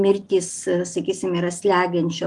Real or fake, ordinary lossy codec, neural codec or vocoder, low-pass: real; Opus, 32 kbps; none; 10.8 kHz